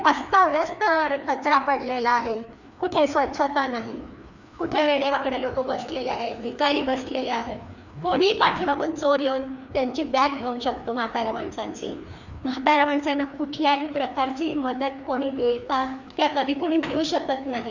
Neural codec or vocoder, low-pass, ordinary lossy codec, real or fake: codec, 16 kHz, 2 kbps, FreqCodec, larger model; 7.2 kHz; none; fake